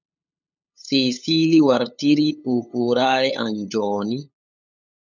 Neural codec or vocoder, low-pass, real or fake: codec, 16 kHz, 8 kbps, FunCodec, trained on LibriTTS, 25 frames a second; 7.2 kHz; fake